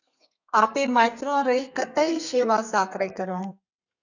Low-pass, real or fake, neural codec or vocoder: 7.2 kHz; fake; codec, 32 kHz, 1.9 kbps, SNAC